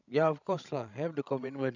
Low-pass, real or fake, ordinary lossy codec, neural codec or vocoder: 7.2 kHz; fake; none; codec, 16 kHz, 16 kbps, FreqCodec, larger model